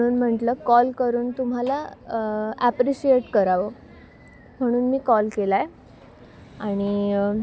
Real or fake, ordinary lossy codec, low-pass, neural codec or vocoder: real; none; none; none